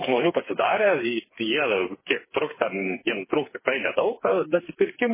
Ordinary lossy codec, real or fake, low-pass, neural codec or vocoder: MP3, 16 kbps; fake; 3.6 kHz; codec, 16 kHz, 4 kbps, FreqCodec, smaller model